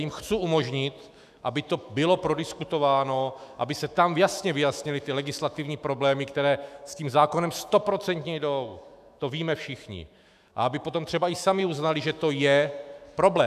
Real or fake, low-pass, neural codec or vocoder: fake; 14.4 kHz; autoencoder, 48 kHz, 128 numbers a frame, DAC-VAE, trained on Japanese speech